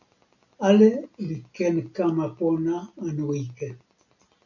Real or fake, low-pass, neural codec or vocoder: real; 7.2 kHz; none